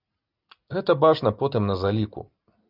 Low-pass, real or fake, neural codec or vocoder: 5.4 kHz; real; none